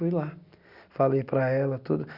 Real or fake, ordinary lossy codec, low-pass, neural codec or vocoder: real; Opus, 64 kbps; 5.4 kHz; none